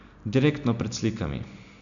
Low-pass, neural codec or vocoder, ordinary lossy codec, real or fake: 7.2 kHz; none; none; real